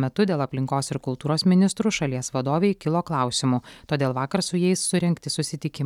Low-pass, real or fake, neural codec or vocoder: 19.8 kHz; real; none